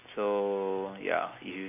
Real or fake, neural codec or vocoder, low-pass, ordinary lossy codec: real; none; 3.6 kHz; none